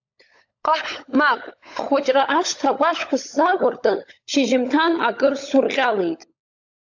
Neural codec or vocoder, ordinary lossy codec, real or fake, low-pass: codec, 16 kHz, 16 kbps, FunCodec, trained on LibriTTS, 50 frames a second; AAC, 48 kbps; fake; 7.2 kHz